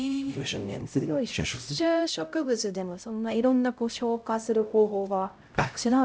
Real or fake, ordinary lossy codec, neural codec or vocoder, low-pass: fake; none; codec, 16 kHz, 0.5 kbps, X-Codec, HuBERT features, trained on LibriSpeech; none